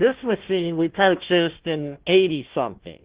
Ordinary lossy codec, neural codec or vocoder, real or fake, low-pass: Opus, 16 kbps; codec, 16 kHz, 1 kbps, FunCodec, trained on Chinese and English, 50 frames a second; fake; 3.6 kHz